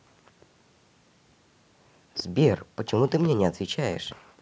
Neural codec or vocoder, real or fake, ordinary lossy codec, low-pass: none; real; none; none